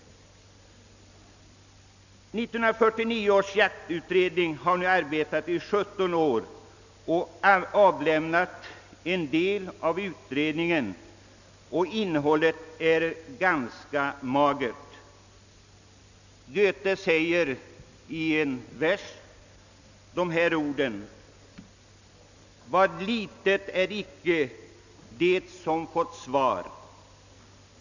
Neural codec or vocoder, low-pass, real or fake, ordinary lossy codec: none; 7.2 kHz; real; none